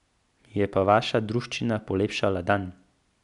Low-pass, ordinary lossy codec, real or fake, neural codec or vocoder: 10.8 kHz; none; real; none